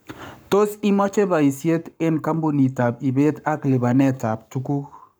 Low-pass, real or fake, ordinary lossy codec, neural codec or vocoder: none; fake; none; codec, 44.1 kHz, 7.8 kbps, Pupu-Codec